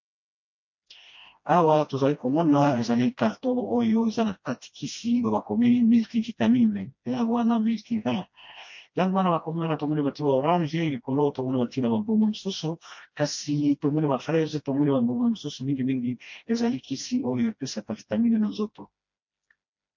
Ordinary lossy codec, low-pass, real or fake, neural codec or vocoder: MP3, 48 kbps; 7.2 kHz; fake; codec, 16 kHz, 1 kbps, FreqCodec, smaller model